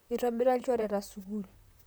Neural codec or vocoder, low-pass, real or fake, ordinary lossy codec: vocoder, 44.1 kHz, 128 mel bands, Pupu-Vocoder; none; fake; none